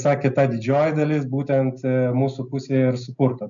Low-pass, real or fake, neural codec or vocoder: 7.2 kHz; real; none